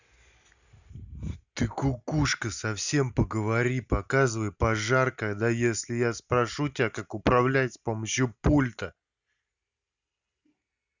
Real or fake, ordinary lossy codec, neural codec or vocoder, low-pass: real; none; none; 7.2 kHz